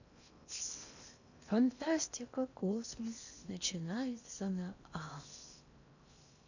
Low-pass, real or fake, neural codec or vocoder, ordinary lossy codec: 7.2 kHz; fake; codec, 16 kHz in and 24 kHz out, 0.8 kbps, FocalCodec, streaming, 65536 codes; none